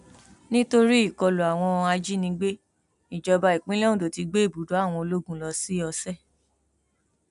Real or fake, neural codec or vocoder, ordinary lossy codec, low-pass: real; none; none; 10.8 kHz